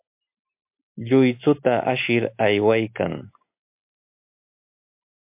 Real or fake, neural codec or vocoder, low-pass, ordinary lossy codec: real; none; 3.6 kHz; MP3, 32 kbps